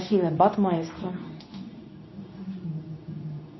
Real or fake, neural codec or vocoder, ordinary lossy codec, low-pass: fake; codec, 24 kHz, 0.9 kbps, WavTokenizer, medium speech release version 2; MP3, 24 kbps; 7.2 kHz